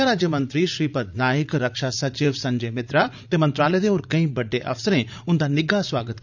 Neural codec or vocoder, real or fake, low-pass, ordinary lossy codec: vocoder, 44.1 kHz, 80 mel bands, Vocos; fake; 7.2 kHz; none